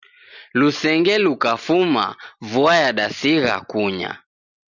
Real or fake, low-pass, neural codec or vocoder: real; 7.2 kHz; none